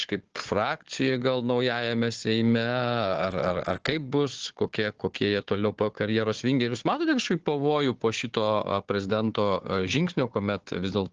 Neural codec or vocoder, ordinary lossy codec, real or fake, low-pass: codec, 16 kHz, 4 kbps, FunCodec, trained on Chinese and English, 50 frames a second; Opus, 16 kbps; fake; 7.2 kHz